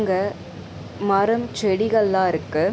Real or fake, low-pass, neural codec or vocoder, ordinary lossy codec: real; none; none; none